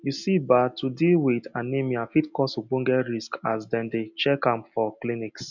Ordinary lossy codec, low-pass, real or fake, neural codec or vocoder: none; 7.2 kHz; real; none